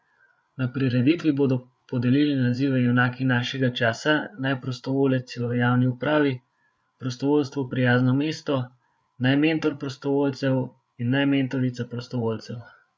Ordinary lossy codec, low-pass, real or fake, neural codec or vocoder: none; none; fake; codec, 16 kHz, 8 kbps, FreqCodec, larger model